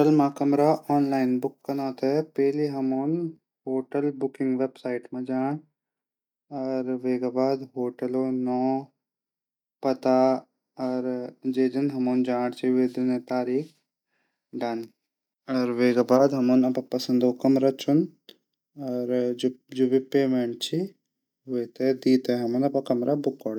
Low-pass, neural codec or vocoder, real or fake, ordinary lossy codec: 19.8 kHz; none; real; none